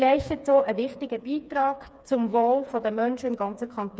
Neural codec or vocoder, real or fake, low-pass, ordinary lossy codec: codec, 16 kHz, 4 kbps, FreqCodec, smaller model; fake; none; none